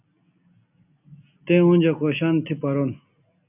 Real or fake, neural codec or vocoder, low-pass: real; none; 3.6 kHz